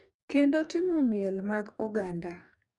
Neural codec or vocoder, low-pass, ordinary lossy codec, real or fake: codec, 44.1 kHz, 2.6 kbps, DAC; 10.8 kHz; none; fake